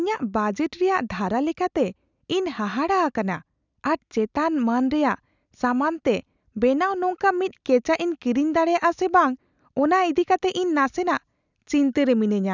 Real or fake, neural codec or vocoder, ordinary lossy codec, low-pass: real; none; none; 7.2 kHz